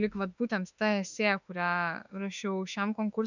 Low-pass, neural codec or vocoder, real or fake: 7.2 kHz; autoencoder, 48 kHz, 32 numbers a frame, DAC-VAE, trained on Japanese speech; fake